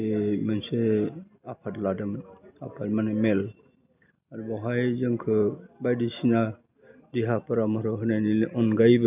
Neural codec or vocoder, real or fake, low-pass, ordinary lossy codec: none; real; 3.6 kHz; none